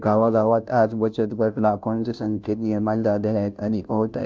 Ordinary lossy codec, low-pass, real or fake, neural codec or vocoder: none; none; fake; codec, 16 kHz, 0.5 kbps, FunCodec, trained on Chinese and English, 25 frames a second